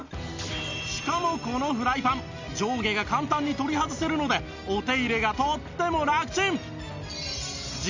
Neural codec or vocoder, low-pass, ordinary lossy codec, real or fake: none; 7.2 kHz; MP3, 64 kbps; real